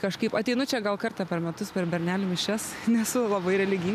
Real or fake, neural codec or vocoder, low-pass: real; none; 14.4 kHz